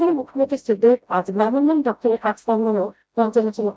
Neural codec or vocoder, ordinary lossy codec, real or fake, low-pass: codec, 16 kHz, 0.5 kbps, FreqCodec, smaller model; none; fake; none